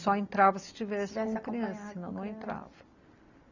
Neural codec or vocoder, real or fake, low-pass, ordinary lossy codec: none; real; 7.2 kHz; none